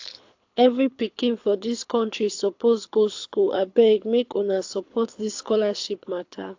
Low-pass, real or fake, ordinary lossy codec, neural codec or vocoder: 7.2 kHz; fake; AAC, 48 kbps; codec, 24 kHz, 6 kbps, HILCodec